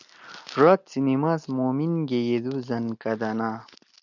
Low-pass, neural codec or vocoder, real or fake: 7.2 kHz; none; real